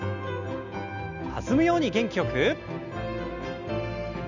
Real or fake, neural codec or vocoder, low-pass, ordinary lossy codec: real; none; 7.2 kHz; none